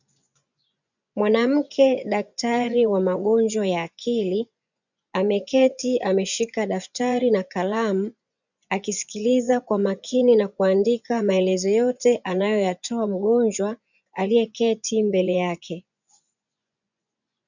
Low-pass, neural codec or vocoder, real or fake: 7.2 kHz; vocoder, 24 kHz, 100 mel bands, Vocos; fake